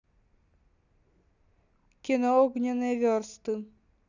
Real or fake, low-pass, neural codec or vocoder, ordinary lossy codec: real; 7.2 kHz; none; none